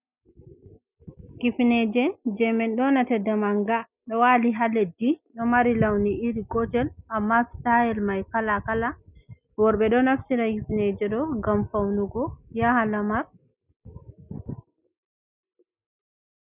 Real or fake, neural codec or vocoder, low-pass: real; none; 3.6 kHz